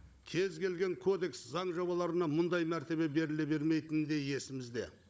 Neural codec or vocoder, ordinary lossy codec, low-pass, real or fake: codec, 16 kHz, 16 kbps, FunCodec, trained on Chinese and English, 50 frames a second; none; none; fake